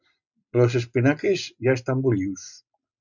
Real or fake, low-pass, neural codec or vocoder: real; 7.2 kHz; none